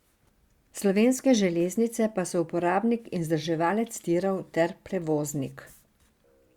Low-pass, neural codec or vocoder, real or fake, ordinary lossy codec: 19.8 kHz; none; real; Opus, 64 kbps